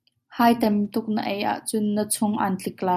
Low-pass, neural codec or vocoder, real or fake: 14.4 kHz; none; real